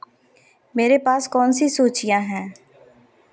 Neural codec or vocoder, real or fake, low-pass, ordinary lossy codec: none; real; none; none